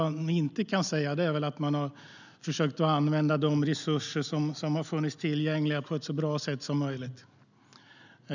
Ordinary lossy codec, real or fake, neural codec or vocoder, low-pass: none; real; none; 7.2 kHz